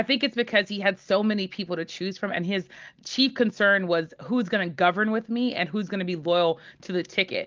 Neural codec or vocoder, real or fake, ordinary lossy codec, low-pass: none; real; Opus, 24 kbps; 7.2 kHz